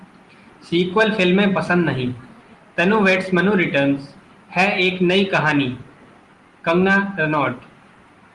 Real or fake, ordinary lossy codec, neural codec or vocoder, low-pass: real; Opus, 24 kbps; none; 10.8 kHz